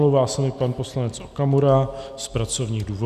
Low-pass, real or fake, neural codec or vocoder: 14.4 kHz; real; none